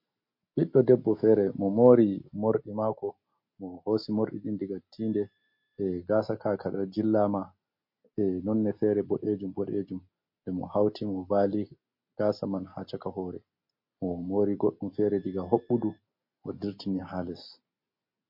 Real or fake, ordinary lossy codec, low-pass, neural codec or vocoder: real; MP3, 32 kbps; 5.4 kHz; none